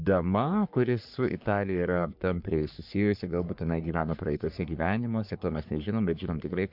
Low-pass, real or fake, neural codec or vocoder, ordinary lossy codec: 5.4 kHz; fake; codec, 44.1 kHz, 3.4 kbps, Pupu-Codec; MP3, 48 kbps